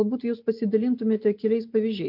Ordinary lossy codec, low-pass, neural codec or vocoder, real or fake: MP3, 48 kbps; 5.4 kHz; none; real